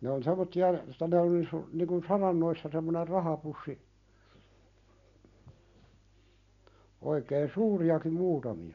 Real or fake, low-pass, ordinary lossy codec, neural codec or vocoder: real; 7.2 kHz; none; none